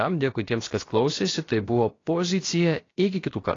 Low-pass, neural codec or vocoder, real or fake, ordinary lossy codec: 7.2 kHz; codec, 16 kHz, about 1 kbps, DyCAST, with the encoder's durations; fake; AAC, 32 kbps